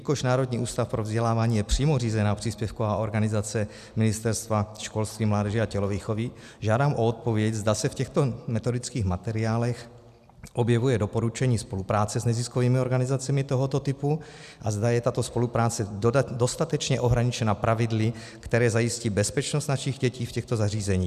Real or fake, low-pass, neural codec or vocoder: real; 14.4 kHz; none